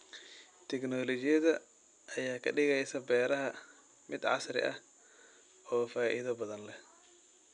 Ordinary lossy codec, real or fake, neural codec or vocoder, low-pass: none; real; none; 9.9 kHz